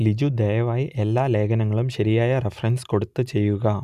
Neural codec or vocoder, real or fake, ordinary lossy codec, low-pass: none; real; none; 14.4 kHz